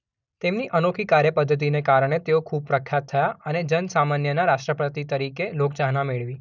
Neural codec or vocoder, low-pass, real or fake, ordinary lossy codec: none; 7.2 kHz; real; none